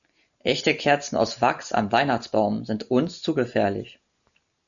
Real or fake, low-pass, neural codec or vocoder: real; 7.2 kHz; none